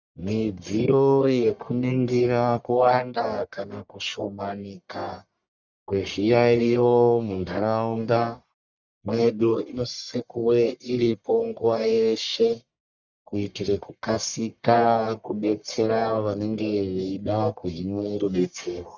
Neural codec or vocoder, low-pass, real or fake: codec, 44.1 kHz, 1.7 kbps, Pupu-Codec; 7.2 kHz; fake